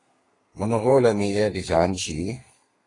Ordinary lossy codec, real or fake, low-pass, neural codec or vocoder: AAC, 32 kbps; fake; 10.8 kHz; codec, 32 kHz, 1.9 kbps, SNAC